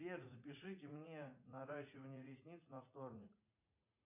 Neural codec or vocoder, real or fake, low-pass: vocoder, 44.1 kHz, 80 mel bands, Vocos; fake; 3.6 kHz